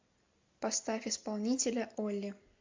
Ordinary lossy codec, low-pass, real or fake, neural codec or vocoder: MP3, 64 kbps; 7.2 kHz; real; none